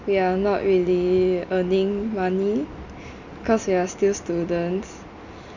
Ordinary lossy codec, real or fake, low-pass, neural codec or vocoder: none; real; 7.2 kHz; none